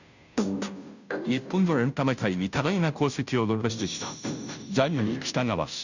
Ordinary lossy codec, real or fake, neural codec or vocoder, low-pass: none; fake; codec, 16 kHz, 0.5 kbps, FunCodec, trained on Chinese and English, 25 frames a second; 7.2 kHz